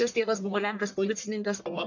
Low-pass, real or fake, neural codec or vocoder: 7.2 kHz; fake; codec, 44.1 kHz, 1.7 kbps, Pupu-Codec